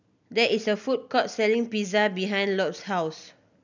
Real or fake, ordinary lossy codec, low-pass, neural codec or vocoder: real; none; 7.2 kHz; none